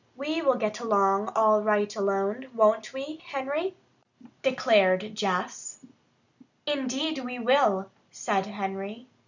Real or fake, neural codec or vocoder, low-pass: real; none; 7.2 kHz